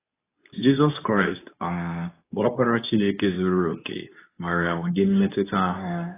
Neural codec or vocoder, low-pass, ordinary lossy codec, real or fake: codec, 24 kHz, 0.9 kbps, WavTokenizer, medium speech release version 2; 3.6 kHz; AAC, 16 kbps; fake